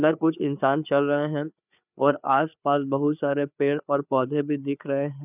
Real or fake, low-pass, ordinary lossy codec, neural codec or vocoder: fake; 3.6 kHz; none; codec, 16 kHz, 4 kbps, FreqCodec, larger model